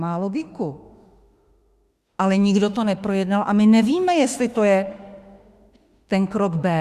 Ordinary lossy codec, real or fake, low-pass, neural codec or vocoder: Opus, 64 kbps; fake; 14.4 kHz; autoencoder, 48 kHz, 32 numbers a frame, DAC-VAE, trained on Japanese speech